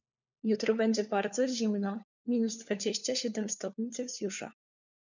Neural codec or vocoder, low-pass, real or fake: codec, 16 kHz, 4 kbps, FunCodec, trained on LibriTTS, 50 frames a second; 7.2 kHz; fake